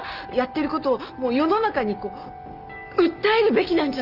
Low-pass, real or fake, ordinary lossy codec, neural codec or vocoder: 5.4 kHz; real; Opus, 24 kbps; none